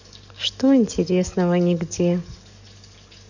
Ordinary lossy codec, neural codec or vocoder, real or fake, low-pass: none; codec, 44.1 kHz, 7.8 kbps, DAC; fake; 7.2 kHz